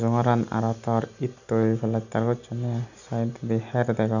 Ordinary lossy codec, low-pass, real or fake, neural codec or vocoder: none; 7.2 kHz; real; none